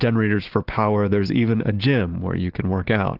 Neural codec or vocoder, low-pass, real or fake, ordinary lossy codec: none; 5.4 kHz; real; Opus, 16 kbps